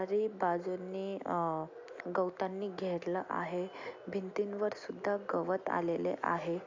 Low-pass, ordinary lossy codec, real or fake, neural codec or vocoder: 7.2 kHz; AAC, 48 kbps; real; none